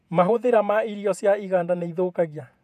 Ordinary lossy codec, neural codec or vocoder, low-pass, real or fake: none; vocoder, 48 kHz, 128 mel bands, Vocos; 14.4 kHz; fake